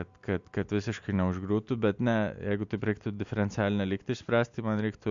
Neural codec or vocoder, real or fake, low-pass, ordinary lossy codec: none; real; 7.2 kHz; MP3, 64 kbps